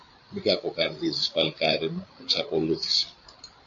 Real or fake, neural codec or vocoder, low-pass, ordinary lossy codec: fake; codec, 16 kHz, 8 kbps, FreqCodec, larger model; 7.2 kHz; AAC, 64 kbps